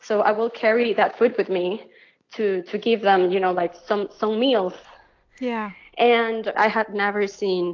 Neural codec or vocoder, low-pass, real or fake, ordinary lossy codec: none; 7.2 kHz; real; AAC, 48 kbps